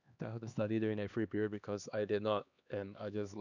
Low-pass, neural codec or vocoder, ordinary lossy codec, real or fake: 7.2 kHz; codec, 16 kHz, 2 kbps, X-Codec, HuBERT features, trained on LibriSpeech; none; fake